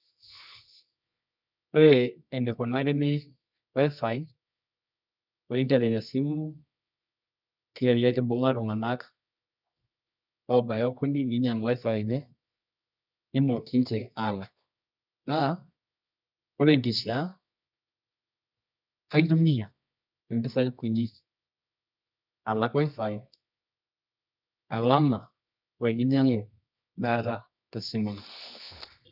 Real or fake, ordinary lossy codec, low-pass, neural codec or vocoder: fake; none; 5.4 kHz; codec, 24 kHz, 0.9 kbps, WavTokenizer, medium music audio release